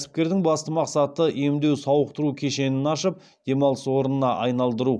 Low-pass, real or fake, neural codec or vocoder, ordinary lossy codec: none; real; none; none